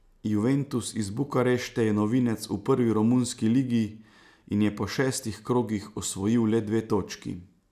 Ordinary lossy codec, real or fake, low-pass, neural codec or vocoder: none; real; 14.4 kHz; none